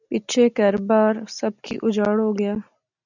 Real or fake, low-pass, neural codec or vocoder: real; 7.2 kHz; none